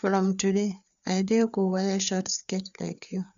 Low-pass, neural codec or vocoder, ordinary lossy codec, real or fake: 7.2 kHz; codec, 16 kHz, 4 kbps, FreqCodec, larger model; none; fake